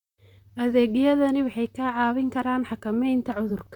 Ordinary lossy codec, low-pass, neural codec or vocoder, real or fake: none; 19.8 kHz; vocoder, 44.1 kHz, 128 mel bands, Pupu-Vocoder; fake